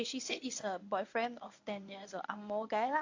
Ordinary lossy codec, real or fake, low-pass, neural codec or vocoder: AAC, 48 kbps; fake; 7.2 kHz; codec, 24 kHz, 0.9 kbps, WavTokenizer, medium speech release version 1